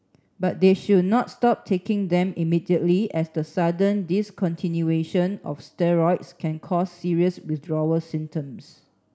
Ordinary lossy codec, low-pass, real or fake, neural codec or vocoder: none; none; real; none